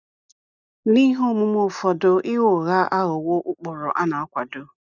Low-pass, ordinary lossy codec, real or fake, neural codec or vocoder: 7.2 kHz; none; real; none